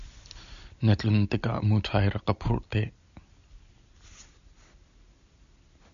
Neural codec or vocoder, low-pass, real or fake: none; 7.2 kHz; real